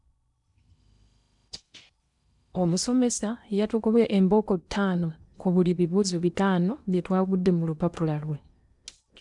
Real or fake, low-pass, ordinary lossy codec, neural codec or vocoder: fake; 10.8 kHz; none; codec, 16 kHz in and 24 kHz out, 0.8 kbps, FocalCodec, streaming, 65536 codes